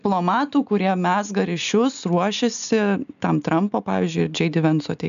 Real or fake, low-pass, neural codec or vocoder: real; 7.2 kHz; none